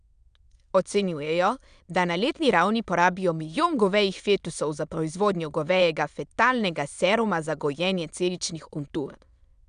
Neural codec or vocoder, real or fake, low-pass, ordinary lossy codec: autoencoder, 22.05 kHz, a latent of 192 numbers a frame, VITS, trained on many speakers; fake; 9.9 kHz; none